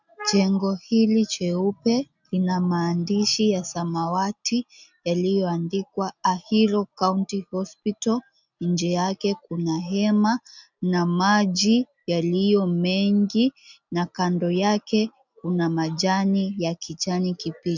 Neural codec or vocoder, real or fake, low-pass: none; real; 7.2 kHz